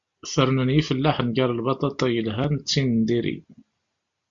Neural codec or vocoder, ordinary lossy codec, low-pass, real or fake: none; AAC, 64 kbps; 7.2 kHz; real